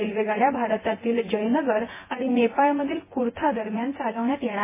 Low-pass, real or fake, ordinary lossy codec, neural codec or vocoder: 3.6 kHz; fake; MP3, 16 kbps; vocoder, 24 kHz, 100 mel bands, Vocos